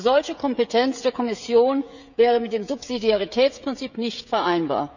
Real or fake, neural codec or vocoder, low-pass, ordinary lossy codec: fake; codec, 16 kHz, 16 kbps, FreqCodec, smaller model; 7.2 kHz; none